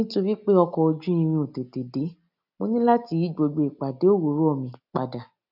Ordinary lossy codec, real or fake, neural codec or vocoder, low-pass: none; real; none; 5.4 kHz